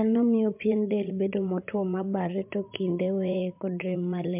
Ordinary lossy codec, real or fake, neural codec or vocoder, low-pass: MP3, 32 kbps; fake; vocoder, 44.1 kHz, 80 mel bands, Vocos; 3.6 kHz